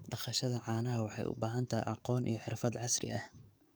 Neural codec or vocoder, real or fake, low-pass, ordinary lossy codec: codec, 44.1 kHz, 7.8 kbps, DAC; fake; none; none